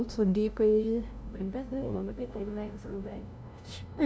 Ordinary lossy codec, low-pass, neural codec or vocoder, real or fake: none; none; codec, 16 kHz, 0.5 kbps, FunCodec, trained on LibriTTS, 25 frames a second; fake